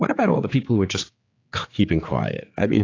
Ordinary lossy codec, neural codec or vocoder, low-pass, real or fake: AAC, 32 kbps; codec, 16 kHz, 4 kbps, X-Codec, HuBERT features, trained on general audio; 7.2 kHz; fake